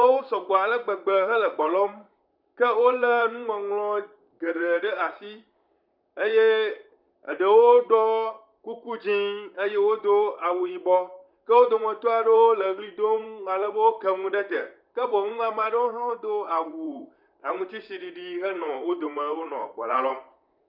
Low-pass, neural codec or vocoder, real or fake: 5.4 kHz; vocoder, 44.1 kHz, 128 mel bands, Pupu-Vocoder; fake